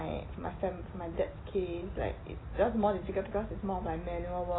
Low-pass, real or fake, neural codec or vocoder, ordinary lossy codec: 7.2 kHz; real; none; AAC, 16 kbps